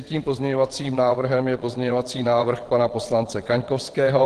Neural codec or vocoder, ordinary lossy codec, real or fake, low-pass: vocoder, 22.05 kHz, 80 mel bands, WaveNeXt; Opus, 16 kbps; fake; 9.9 kHz